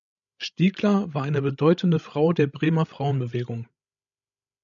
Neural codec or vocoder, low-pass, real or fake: codec, 16 kHz, 16 kbps, FreqCodec, larger model; 7.2 kHz; fake